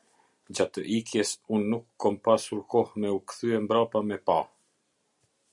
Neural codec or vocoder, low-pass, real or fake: none; 10.8 kHz; real